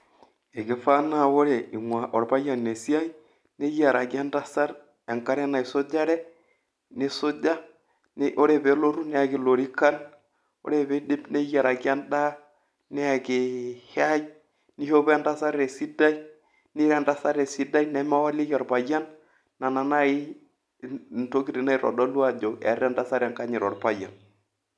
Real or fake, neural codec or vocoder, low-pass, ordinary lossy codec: real; none; none; none